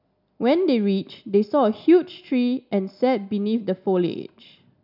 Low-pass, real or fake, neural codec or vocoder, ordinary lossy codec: 5.4 kHz; real; none; none